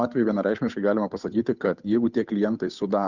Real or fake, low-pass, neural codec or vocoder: fake; 7.2 kHz; codec, 16 kHz, 8 kbps, FunCodec, trained on Chinese and English, 25 frames a second